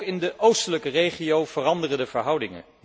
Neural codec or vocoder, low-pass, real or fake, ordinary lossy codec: none; none; real; none